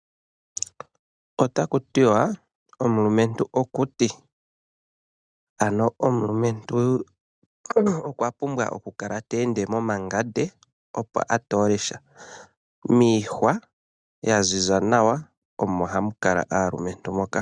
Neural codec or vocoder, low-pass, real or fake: none; 9.9 kHz; real